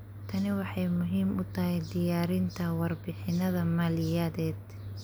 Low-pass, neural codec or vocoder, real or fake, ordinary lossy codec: none; none; real; none